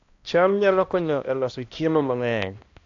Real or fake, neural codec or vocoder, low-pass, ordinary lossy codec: fake; codec, 16 kHz, 1 kbps, X-Codec, HuBERT features, trained on balanced general audio; 7.2 kHz; AAC, 48 kbps